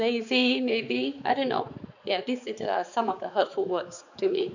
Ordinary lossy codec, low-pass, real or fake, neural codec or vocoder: none; 7.2 kHz; fake; codec, 16 kHz, 4 kbps, X-Codec, HuBERT features, trained on balanced general audio